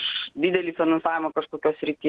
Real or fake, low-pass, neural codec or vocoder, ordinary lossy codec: real; 10.8 kHz; none; Opus, 16 kbps